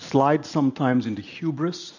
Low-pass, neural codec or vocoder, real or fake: 7.2 kHz; none; real